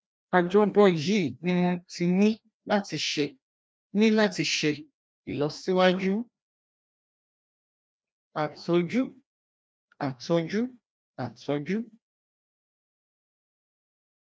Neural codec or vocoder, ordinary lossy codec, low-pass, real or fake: codec, 16 kHz, 1 kbps, FreqCodec, larger model; none; none; fake